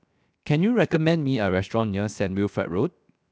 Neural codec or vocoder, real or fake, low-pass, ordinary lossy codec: codec, 16 kHz, 0.7 kbps, FocalCodec; fake; none; none